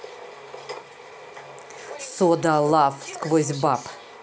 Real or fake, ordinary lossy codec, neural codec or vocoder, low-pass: real; none; none; none